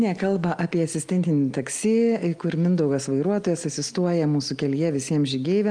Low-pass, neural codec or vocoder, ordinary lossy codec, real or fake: 9.9 kHz; none; Opus, 32 kbps; real